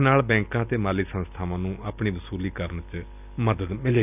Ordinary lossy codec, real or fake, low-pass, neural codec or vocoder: none; real; 3.6 kHz; none